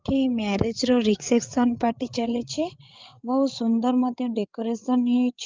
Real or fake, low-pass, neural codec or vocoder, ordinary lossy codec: fake; 7.2 kHz; codec, 16 kHz, 8 kbps, FreqCodec, larger model; Opus, 32 kbps